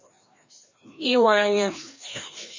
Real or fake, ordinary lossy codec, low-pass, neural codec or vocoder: fake; MP3, 32 kbps; 7.2 kHz; codec, 16 kHz, 1 kbps, FreqCodec, larger model